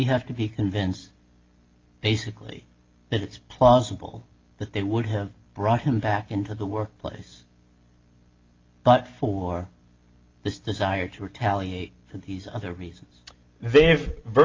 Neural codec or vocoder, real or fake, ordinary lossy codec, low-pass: none; real; Opus, 24 kbps; 7.2 kHz